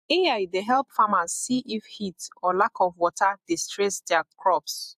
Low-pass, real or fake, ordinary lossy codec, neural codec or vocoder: 14.4 kHz; fake; AAC, 96 kbps; vocoder, 48 kHz, 128 mel bands, Vocos